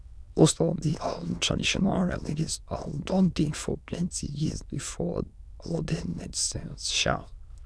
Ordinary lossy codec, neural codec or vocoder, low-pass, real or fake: none; autoencoder, 22.05 kHz, a latent of 192 numbers a frame, VITS, trained on many speakers; none; fake